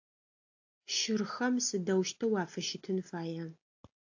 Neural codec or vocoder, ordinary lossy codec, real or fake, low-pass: none; AAC, 48 kbps; real; 7.2 kHz